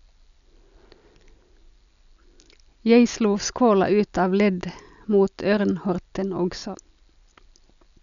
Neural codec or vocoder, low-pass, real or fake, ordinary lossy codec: none; 7.2 kHz; real; none